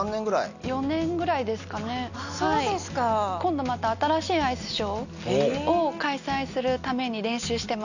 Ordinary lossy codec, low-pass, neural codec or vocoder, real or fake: none; 7.2 kHz; none; real